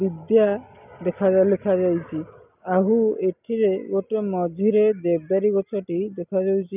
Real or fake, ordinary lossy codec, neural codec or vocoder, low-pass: real; none; none; 3.6 kHz